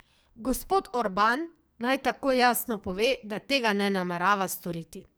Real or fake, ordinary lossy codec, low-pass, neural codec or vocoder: fake; none; none; codec, 44.1 kHz, 2.6 kbps, SNAC